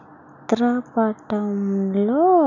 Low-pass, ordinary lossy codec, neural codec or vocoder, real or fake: 7.2 kHz; none; none; real